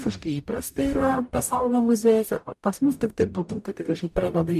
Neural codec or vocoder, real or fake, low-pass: codec, 44.1 kHz, 0.9 kbps, DAC; fake; 14.4 kHz